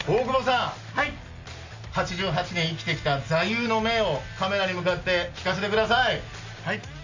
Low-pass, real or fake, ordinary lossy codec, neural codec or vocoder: 7.2 kHz; real; MP3, 48 kbps; none